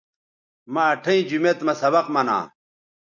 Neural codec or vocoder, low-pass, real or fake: none; 7.2 kHz; real